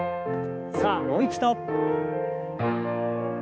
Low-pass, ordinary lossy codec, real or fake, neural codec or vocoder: none; none; fake; codec, 16 kHz, 4 kbps, X-Codec, HuBERT features, trained on balanced general audio